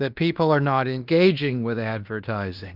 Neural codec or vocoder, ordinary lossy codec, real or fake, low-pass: codec, 16 kHz in and 24 kHz out, 0.9 kbps, LongCat-Audio-Codec, fine tuned four codebook decoder; Opus, 24 kbps; fake; 5.4 kHz